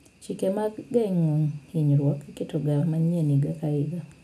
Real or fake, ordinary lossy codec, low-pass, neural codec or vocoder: real; none; none; none